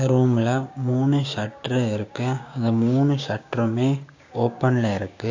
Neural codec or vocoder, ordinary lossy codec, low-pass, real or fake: codec, 16 kHz, 6 kbps, DAC; AAC, 48 kbps; 7.2 kHz; fake